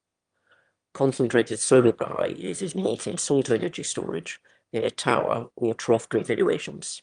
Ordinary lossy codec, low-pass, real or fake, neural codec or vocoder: Opus, 32 kbps; 9.9 kHz; fake; autoencoder, 22.05 kHz, a latent of 192 numbers a frame, VITS, trained on one speaker